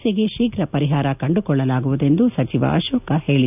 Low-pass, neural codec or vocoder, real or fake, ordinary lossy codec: 3.6 kHz; none; real; none